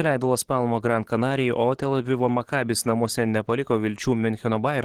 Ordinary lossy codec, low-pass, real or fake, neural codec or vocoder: Opus, 16 kbps; 19.8 kHz; fake; codec, 44.1 kHz, 7.8 kbps, DAC